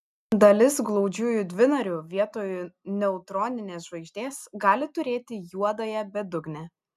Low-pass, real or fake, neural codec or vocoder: 14.4 kHz; real; none